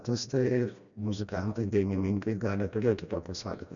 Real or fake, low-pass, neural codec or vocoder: fake; 7.2 kHz; codec, 16 kHz, 1 kbps, FreqCodec, smaller model